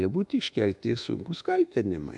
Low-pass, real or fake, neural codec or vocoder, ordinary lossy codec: 10.8 kHz; fake; codec, 24 kHz, 1.2 kbps, DualCodec; MP3, 96 kbps